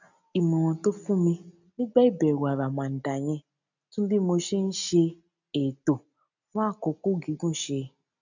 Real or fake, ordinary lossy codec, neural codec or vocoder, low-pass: real; none; none; 7.2 kHz